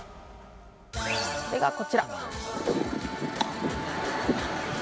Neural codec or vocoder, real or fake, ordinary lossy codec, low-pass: none; real; none; none